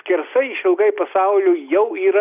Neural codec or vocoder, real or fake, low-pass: none; real; 3.6 kHz